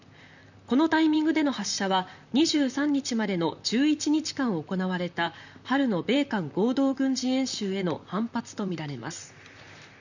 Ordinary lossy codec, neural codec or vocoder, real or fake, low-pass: none; vocoder, 44.1 kHz, 128 mel bands, Pupu-Vocoder; fake; 7.2 kHz